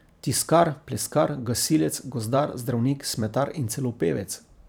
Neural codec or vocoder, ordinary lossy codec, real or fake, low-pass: vocoder, 44.1 kHz, 128 mel bands every 512 samples, BigVGAN v2; none; fake; none